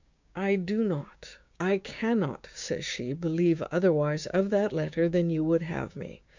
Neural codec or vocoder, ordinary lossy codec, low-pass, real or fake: codec, 16 kHz, 6 kbps, DAC; MP3, 64 kbps; 7.2 kHz; fake